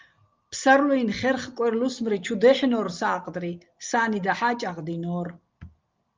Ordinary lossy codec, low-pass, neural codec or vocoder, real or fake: Opus, 24 kbps; 7.2 kHz; none; real